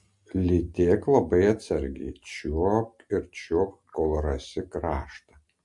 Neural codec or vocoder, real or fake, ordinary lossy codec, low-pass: none; real; MP3, 48 kbps; 10.8 kHz